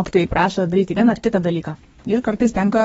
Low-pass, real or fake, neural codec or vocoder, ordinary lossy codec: 14.4 kHz; fake; codec, 32 kHz, 1.9 kbps, SNAC; AAC, 24 kbps